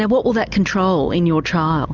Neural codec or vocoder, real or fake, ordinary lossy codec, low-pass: none; real; Opus, 24 kbps; 7.2 kHz